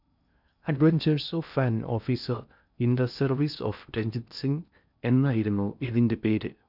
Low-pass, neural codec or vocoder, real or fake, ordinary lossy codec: 5.4 kHz; codec, 16 kHz in and 24 kHz out, 0.6 kbps, FocalCodec, streaming, 2048 codes; fake; none